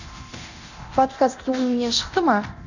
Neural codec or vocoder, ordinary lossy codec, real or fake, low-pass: codec, 24 kHz, 0.9 kbps, DualCodec; none; fake; 7.2 kHz